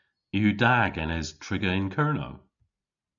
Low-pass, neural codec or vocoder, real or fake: 7.2 kHz; none; real